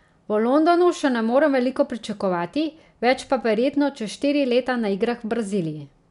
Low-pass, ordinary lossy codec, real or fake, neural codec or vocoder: 10.8 kHz; none; real; none